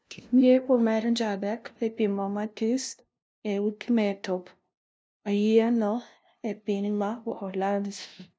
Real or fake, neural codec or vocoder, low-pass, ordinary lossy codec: fake; codec, 16 kHz, 0.5 kbps, FunCodec, trained on LibriTTS, 25 frames a second; none; none